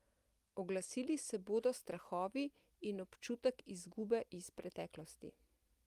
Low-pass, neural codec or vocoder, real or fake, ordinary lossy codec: 14.4 kHz; none; real; Opus, 32 kbps